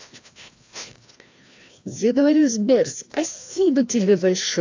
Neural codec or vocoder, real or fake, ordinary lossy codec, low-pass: codec, 16 kHz, 1 kbps, FreqCodec, larger model; fake; AAC, 48 kbps; 7.2 kHz